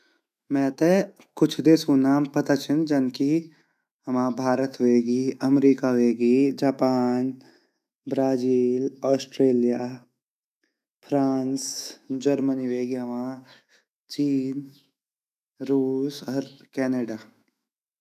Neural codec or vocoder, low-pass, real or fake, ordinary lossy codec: autoencoder, 48 kHz, 128 numbers a frame, DAC-VAE, trained on Japanese speech; 14.4 kHz; fake; none